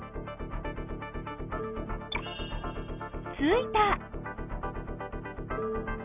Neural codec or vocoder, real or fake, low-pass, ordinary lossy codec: none; real; 3.6 kHz; none